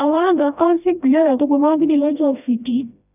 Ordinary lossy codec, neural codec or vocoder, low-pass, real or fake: none; codec, 16 kHz, 1 kbps, FreqCodec, smaller model; 3.6 kHz; fake